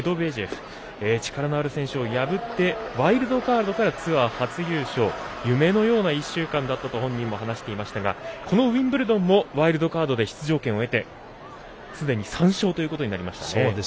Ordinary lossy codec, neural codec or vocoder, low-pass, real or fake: none; none; none; real